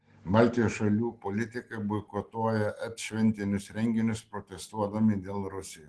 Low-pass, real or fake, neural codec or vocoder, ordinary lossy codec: 10.8 kHz; real; none; Opus, 16 kbps